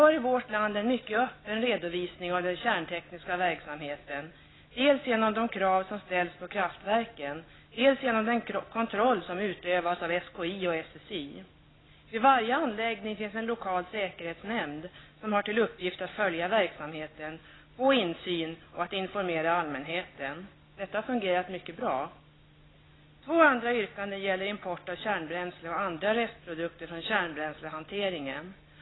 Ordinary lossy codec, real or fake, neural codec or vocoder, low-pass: AAC, 16 kbps; real; none; 7.2 kHz